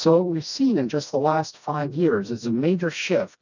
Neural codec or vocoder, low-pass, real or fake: codec, 16 kHz, 1 kbps, FreqCodec, smaller model; 7.2 kHz; fake